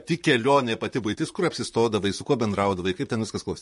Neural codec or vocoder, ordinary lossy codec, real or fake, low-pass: vocoder, 44.1 kHz, 128 mel bands, Pupu-Vocoder; MP3, 48 kbps; fake; 14.4 kHz